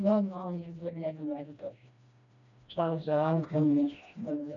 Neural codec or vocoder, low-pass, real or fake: codec, 16 kHz, 1 kbps, FreqCodec, smaller model; 7.2 kHz; fake